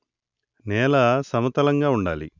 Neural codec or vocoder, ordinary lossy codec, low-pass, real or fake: none; none; 7.2 kHz; real